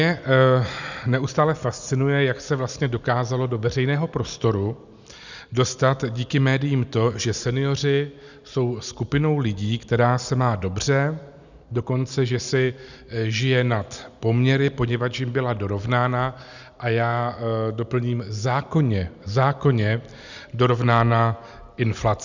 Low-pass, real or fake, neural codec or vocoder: 7.2 kHz; real; none